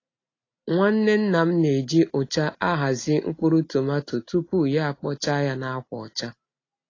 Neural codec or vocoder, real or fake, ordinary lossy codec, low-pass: none; real; AAC, 32 kbps; 7.2 kHz